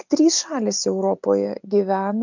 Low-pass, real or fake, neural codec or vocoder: 7.2 kHz; real; none